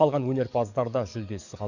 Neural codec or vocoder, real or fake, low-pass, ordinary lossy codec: codec, 44.1 kHz, 7.8 kbps, DAC; fake; 7.2 kHz; none